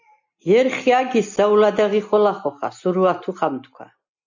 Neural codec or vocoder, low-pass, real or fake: none; 7.2 kHz; real